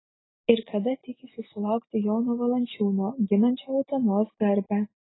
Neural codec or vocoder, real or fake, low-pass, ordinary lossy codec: none; real; 7.2 kHz; AAC, 16 kbps